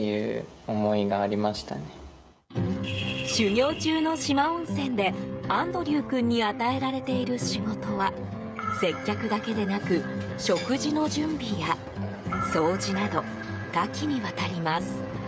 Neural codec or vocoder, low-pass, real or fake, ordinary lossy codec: codec, 16 kHz, 16 kbps, FreqCodec, smaller model; none; fake; none